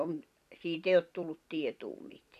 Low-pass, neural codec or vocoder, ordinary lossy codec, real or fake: 14.4 kHz; vocoder, 44.1 kHz, 128 mel bands every 256 samples, BigVGAN v2; none; fake